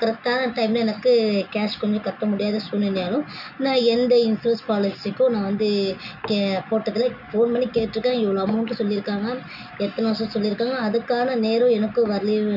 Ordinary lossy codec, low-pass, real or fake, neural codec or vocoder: none; 5.4 kHz; real; none